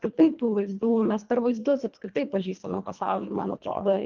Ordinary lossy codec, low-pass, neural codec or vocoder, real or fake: Opus, 32 kbps; 7.2 kHz; codec, 24 kHz, 1.5 kbps, HILCodec; fake